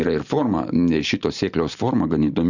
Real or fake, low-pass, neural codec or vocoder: real; 7.2 kHz; none